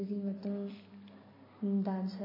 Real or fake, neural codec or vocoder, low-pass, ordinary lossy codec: real; none; 5.4 kHz; MP3, 24 kbps